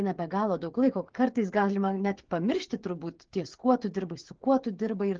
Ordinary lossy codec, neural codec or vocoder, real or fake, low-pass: Opus, 16 kbps; codec, 16 kHz, 16 kbps, FreqCodec, smaller model; fake; 7.2 kHz